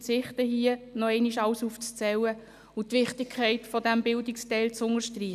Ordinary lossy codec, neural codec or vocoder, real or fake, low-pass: none; none; real; 14.4 kHz